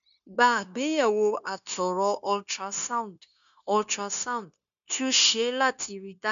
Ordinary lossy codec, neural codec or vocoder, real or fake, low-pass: none; codec, 16 kHz, 0.9 kbps, LongCat-Audio-Codec; fake; 7.2 kHz